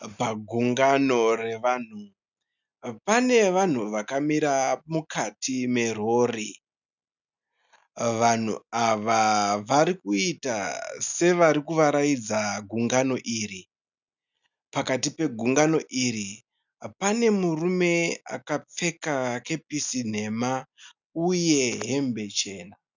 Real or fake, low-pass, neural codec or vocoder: real; 7.2 kHz; none